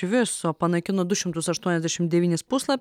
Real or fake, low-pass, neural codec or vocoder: real; 19.8 kHz; none